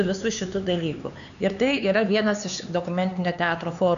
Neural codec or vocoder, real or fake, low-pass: codec, 16 kHz, 4 kbps, X-Codec, HuBERT features, trained on LibriSpeech; fake; 7.2 kHz